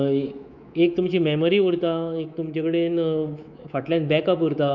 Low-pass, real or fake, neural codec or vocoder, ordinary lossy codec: 7.2 kHz; fake; codec, 24 kHz, 3.1 kbps, DualCodec; none